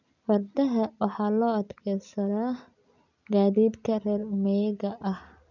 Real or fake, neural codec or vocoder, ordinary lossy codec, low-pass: real; none; none; 7.2 kHz